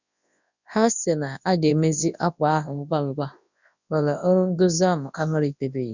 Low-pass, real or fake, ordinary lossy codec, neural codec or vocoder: 7.2 kHz; fake; none; codec, 24 kHz, 0.9 kbps, WavTokenizer, large speech release